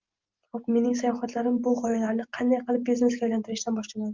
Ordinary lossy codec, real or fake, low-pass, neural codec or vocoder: Opus, 32 kbps; real; 7.2 kHz; none